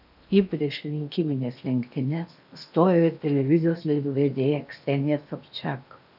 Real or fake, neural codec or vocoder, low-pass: fake; codec, 16 kHz in and 24 kHz out, 0.8 kbps, FocalCodec, streaming, 65536 codes; 5.4 kHz